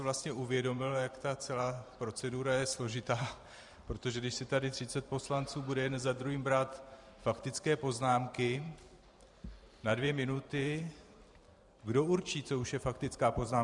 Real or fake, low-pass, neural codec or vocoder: fake; 10.8 kHz; vocoder, 44.1 kHz, 128 mel bands every 512 samples, BigVGAN v2